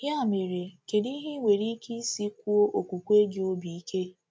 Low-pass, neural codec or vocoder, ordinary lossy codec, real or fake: none; none; none; real